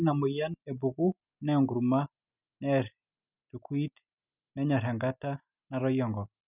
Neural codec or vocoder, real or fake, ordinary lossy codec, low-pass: none; real; none; 3.6 kHz